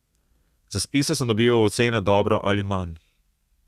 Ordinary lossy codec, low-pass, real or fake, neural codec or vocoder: Opus, 64 kbps; 14.4 kHz; fake; codec, 32 kHz, 1.9 kbps, SNAC